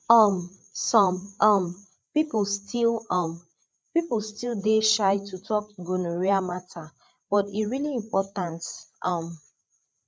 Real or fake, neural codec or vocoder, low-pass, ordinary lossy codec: fake; codec, 16 kHz, 8 kbps, FreqCodec, larger model; none; none